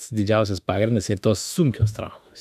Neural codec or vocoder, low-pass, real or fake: autoencoder, 48 kHz, 32 numbers a frame, DAC-VAE, trained on Japanese speech; 14.4 kHz; fake